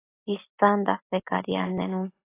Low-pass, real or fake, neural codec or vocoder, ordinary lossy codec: 3.6 kHz; real; none; AAC, 24 kbps